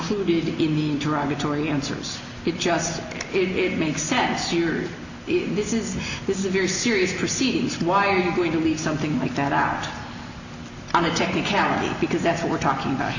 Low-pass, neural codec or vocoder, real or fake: 7.2 kHz; none; real